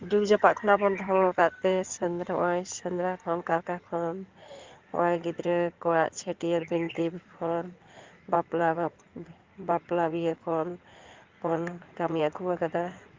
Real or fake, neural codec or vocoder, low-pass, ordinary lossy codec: fake; codec, 16 kHz in and 24 kHz out, 2.2 kbps, FireRedTTS-2 codec; 7.2 kHz; Opus, 32 kbps